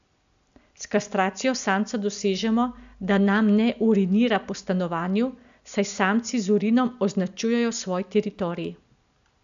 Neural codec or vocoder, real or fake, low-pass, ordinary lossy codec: none; real; 7.2 kHz; AAC, 96 kbps